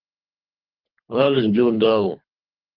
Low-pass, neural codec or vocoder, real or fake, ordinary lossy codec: 5.4 kHz; codec, 24 kHz, 3 kbps, HILCodec; fake; Opus, 32 kbps